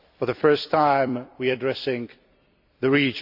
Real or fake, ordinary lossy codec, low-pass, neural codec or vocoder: fake; AAC, 48 kbps; 5.4 kHz; vocoder, 44.1 kHz, 128 mel bands every 256 samples, BigVGAN v2